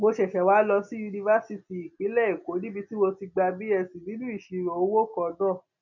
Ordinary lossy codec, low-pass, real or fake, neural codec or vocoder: none; 7.2 kHz; real; none